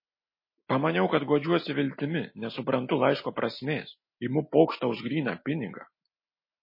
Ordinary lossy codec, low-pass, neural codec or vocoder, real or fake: MP3, 24 kbps; 5.4 kHz; none; real